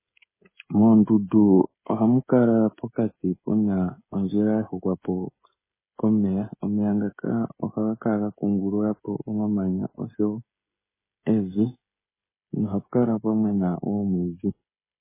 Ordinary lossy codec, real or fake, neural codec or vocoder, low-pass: MP3, 16 kbps; fake; codec, 16 kHz, 16 kbps, FreqCodec, smaller model; 3.6 kHz